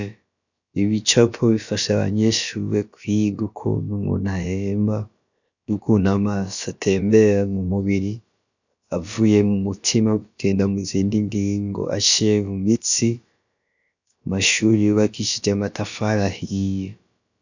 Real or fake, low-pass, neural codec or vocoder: fake; 7.2 kHz; codec, 16 kHz, about 1 kbps, DyCAST, with the encoder's durations